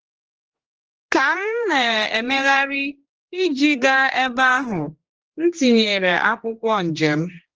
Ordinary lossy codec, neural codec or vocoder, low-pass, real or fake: Opus, 16 kbps; codec, 16 kHz, 2 kbps, X-Codec, HuBERT features, trained on general audio; 7.2 kHz; fake